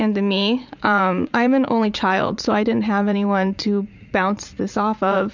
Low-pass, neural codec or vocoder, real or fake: 7.2 kHz; vocoder, 44.1 kHz, 80 mel bands, Vocos; fake